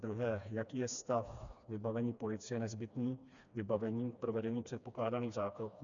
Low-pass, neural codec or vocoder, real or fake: 7.2 kHz; codec, 16 kHz, 2 kbps, FreqCodec, smaller model; fake